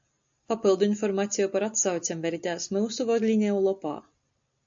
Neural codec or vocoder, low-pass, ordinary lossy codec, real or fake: none; 7.2 kHz; AAC, 64 kbps; real